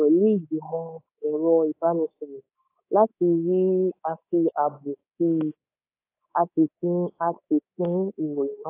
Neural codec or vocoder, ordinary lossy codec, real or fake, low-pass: codec, 24 kHz, 3.1 kbps, DualCodec; AAC, 24 kbps; fake; 3.6 kHz